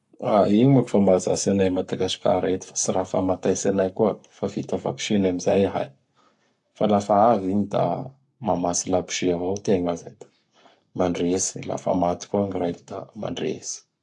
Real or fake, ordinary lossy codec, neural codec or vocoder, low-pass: fake; AAC, 64 kbps; codec, 44.1 kHz, 7.8 kbps, Pupu-Codec; 10.8 kHz